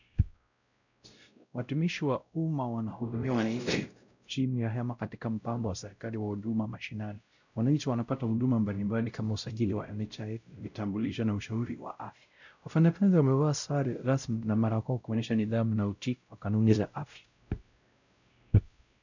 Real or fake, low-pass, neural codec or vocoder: fake; 7.2 kHz; codec, 16 kHz, 0.5 kbps, X-Codec, WavLM features, trained on Multilingual LibriSpeech